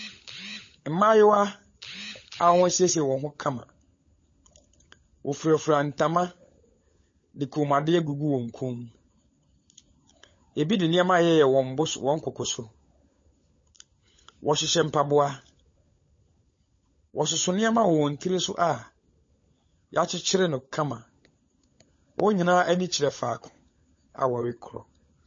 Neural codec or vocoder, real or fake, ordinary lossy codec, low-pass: codec, 16 kHz, 16 kbps, FunCodec, trained on LibriTTS, 50 frames a second; fake; MP3, 32 kbps; 7.2 kHz